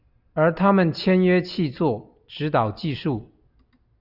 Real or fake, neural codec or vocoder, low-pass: real; none; 5.4 kHz